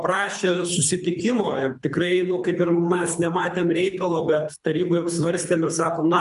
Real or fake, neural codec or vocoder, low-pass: fake; codec, 24 kHz, 3 kbps, HILCodec; 10.8 kHz